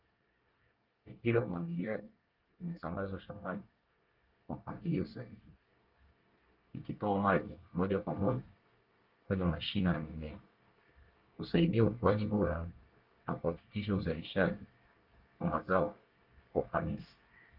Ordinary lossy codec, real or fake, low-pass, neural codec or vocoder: Opus, 24 kbps; fake; 5.4 kHz; codec, 24 kHz, 1 kbps, SNAC